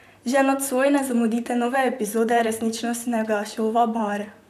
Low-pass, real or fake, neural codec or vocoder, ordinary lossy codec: 14.4 kHz; fake; vocoder, 44.1 kHz, 128 mel bands, Pupu-Vocoder; none